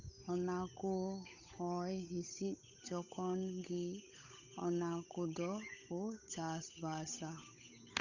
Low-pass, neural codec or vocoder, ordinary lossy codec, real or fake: 7.2 kHz; codec, 16 kHz, 8 kbps, FunCodec, trained on Chinese and English, 25 frames a second; none; fake